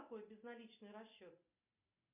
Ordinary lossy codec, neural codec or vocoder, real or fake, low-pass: MP3, 32 kbps; none; real; 3.6 kHz